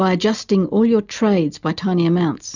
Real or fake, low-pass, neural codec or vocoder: real; 7.2 kHz; none